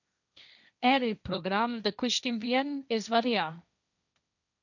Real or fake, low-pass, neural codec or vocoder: fake; 7.2 kHz; codec, 16 kHz, 1.1 kbps, Voila-Tokenizer